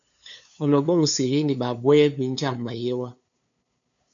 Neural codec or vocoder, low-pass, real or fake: codec, 16 kHz, 2 kbps, FunCodec, trained on LibriTTS, 25 frames a second; 7.2 kHz; fake